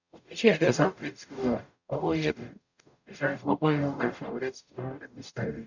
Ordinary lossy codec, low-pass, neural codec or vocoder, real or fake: none; 7.2 kHz; codec, 44.1 kHz, 0.9 kbps, DAC; fake